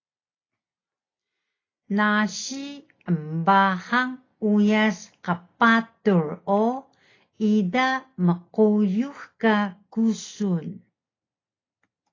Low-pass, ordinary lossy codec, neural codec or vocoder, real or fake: 7.2 kHz; AAC, 32 kbps; none; real